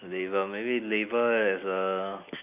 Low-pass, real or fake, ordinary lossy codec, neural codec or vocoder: 3.6 kHz; real; none; none